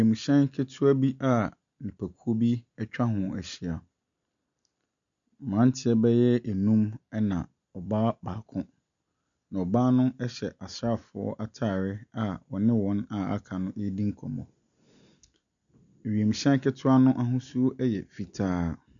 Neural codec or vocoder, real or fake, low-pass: none; real; 7.2 kHz